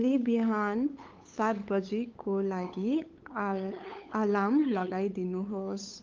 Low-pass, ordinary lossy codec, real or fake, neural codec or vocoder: 7.2 kHz; Opus, 32 kbps; fake; codec, 16 kHz, 8 kbps, FunCodec, trained on LibriTTS, 25 frames a second